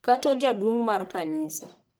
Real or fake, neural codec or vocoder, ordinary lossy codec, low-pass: fake; codec, 44.1 kHz, 1.7 kbps, Pupu-Codec; none; none